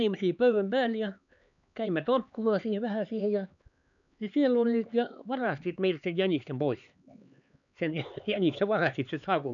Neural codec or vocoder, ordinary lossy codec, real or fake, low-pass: codec, 16 kHz, 4 kbps, X-Codec, HuBERT features, trained on LibriSpeech; none; fake; 7.2 kHz